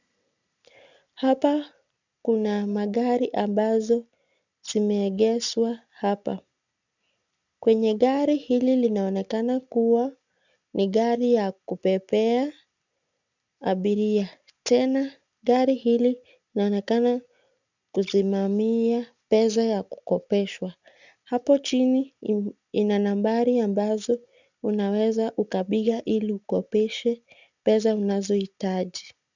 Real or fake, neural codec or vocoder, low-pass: real; none; 7.2 kHz